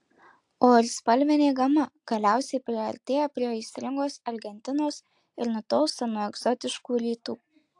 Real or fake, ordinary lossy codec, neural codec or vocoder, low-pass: real; AAC, 64 kbps; none; 10.8 kHz